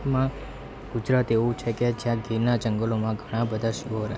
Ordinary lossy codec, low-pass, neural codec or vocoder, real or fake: none; none; none; real